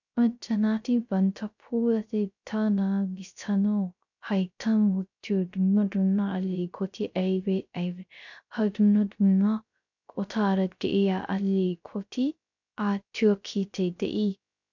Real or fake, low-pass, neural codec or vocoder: fake; 7.2 kHz; codec, 16 kHz, 0.3 kbps, FocalCodec